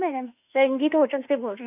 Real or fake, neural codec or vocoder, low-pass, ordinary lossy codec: fake; autoencoder, 48 kHz, 32 numbers a frame, DAC-VAE, trained on Japanese speech; 3.6 kHz; none